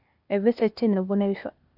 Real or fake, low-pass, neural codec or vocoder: fake; 5.4 kHz; codec, 16 kHz, 0.8 kbps, ZipCodec